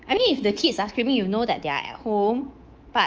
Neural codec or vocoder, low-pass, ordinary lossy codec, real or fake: codec, 24 kHz, 3.1 kbps, DualCodec; 7.2 kHz; Opus, 24 kbps; fake